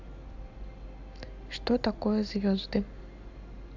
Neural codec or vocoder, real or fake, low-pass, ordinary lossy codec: none; real; 7.2 kHz; AAC, 48 kbps